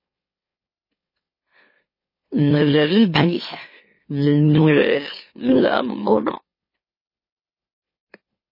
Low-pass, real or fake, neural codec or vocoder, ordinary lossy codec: 5.4 kHz; fake; autoencoder, 44.1 kHz, a latent of 192 numbers a frame, MeloTTS; MP3, 24 kbps